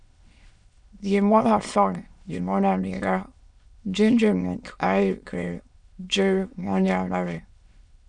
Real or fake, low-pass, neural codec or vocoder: fake; 9.9 kHz; autoencoder, 22.05 kHz, a latent of 192 numbers a frame, VITS, trained on many speakers